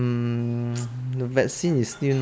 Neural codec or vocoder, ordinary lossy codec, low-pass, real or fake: none; none; none; real